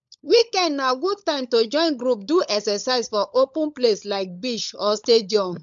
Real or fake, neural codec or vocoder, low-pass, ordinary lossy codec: fake; codec, 16 kHz, 16 kbps, FunCodec, trained on LibriTTS, 50 frames a second; 7.2 kHz; MP3, 64 kbps